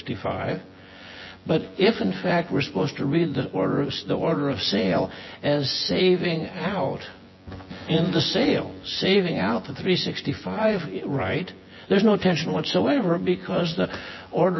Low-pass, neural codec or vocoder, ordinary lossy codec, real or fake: 7.2 kHz; vocoder, 24 kHz, 100 mel bands, Vocos; MP3, 24 kbps; fake